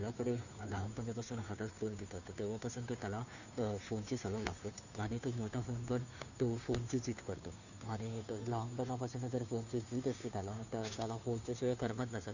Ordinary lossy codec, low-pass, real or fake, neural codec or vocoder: none; 7.2 kHz; fake; codec, 16 kHz, 2 kbps, FunCodec, trained on Chinese and English, 25 frames a second